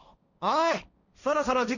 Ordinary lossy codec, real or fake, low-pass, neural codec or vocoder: none; fake; 7.2 kHz; codec, 16 kHz, 1.1 kbps, Voila-Tokenizer